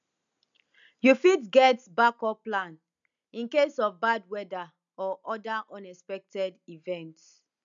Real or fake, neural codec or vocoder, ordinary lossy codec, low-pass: real; none; none; 7.2 kHz